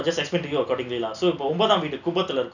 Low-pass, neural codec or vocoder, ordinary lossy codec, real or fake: 7.2 kHz; none; none; real